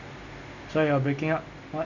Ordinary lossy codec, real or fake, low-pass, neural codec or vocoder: none; real; 7.2 kHz; none